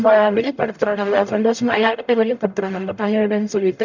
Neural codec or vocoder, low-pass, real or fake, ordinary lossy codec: codec, 44.1 kHz, 0.9 kbps, DAC; 7.2 kHz; fake; none